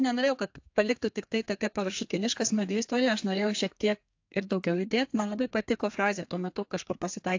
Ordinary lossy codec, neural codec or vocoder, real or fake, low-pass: AAC, 48 kbps; codec, 32 kHz, 1.9 kbps, SNAC; fake; 7.2 kHz